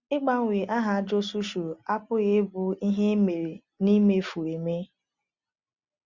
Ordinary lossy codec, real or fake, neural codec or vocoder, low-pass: Opus, 64 kbps; real; none; 7.2 kHz